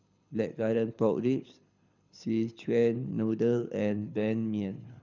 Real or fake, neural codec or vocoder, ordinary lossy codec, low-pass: fake; codec, 24 kHz, 6 kbps, HILCodec; none; 7.2 kHz